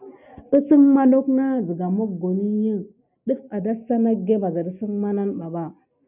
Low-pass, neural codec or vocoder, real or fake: 3.6 kHz; none; real